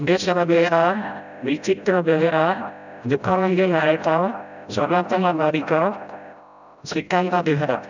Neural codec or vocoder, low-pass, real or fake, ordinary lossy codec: codec, 16 kHz, 0.5 kbps, FreqCodec, smaller model; 7.2 kHz; fake; none